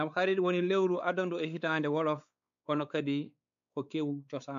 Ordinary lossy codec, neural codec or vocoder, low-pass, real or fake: none; codec, 16 kHz, 4 kbps, X-Codec, WavLM features, trained on Multilingual LibriSpeech; 7.2 kHz; fake